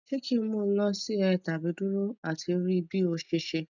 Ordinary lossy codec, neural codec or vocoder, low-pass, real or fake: none; none; 7.2 kHz; real